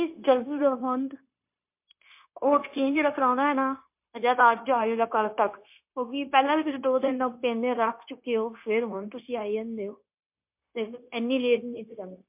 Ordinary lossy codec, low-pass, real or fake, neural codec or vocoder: MP3, 32 kbps; 3.6 kHz; fake; codec, 16 kHz, 0.9 kbps, LongCat-Audio-Codec